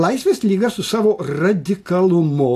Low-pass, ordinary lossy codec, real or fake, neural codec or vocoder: 14.4 kHz; AAC, 64 kbps; real; none